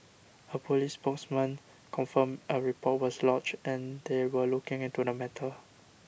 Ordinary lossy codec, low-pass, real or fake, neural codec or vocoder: none; none; real; none